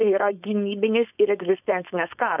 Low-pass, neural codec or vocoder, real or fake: 3.6 kHz; codec, 16 kHz, 4.8 kbps, FACodec; fake